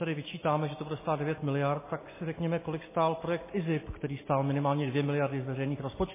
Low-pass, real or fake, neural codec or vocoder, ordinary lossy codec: 3.6 kHz; real; none; MP3, 16 kbps